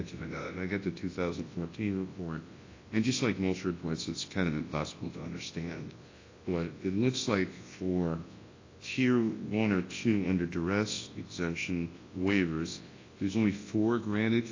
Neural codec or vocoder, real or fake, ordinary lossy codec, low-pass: codec, 24 kHz, 0.9 kbps, WavTokenizer, large speech release; fake; AAC, 32 kbps; 7.2 kHz